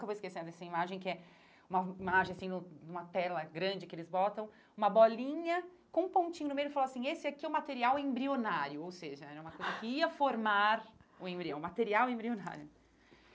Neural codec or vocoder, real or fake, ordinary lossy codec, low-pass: none; real; none; none